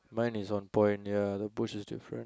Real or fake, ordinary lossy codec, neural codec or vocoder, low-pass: real; none; none; none